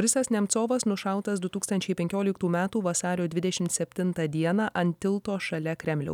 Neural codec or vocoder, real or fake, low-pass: none; real; 19.8 kHz